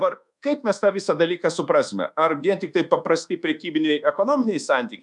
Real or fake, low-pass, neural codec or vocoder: fake; 10.8 kHz; codec, 24 kHz, 1.2 kbps, DualCodec